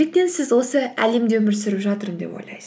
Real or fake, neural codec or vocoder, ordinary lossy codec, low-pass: real; none; none; none